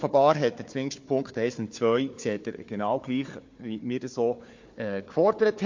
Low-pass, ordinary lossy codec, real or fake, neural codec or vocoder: 7.2 kHz; MP3, 48 kbps; fake; codec, 16 kHz, 4 kbps, FunCodec, trained on Chinese and English, 50 frames a second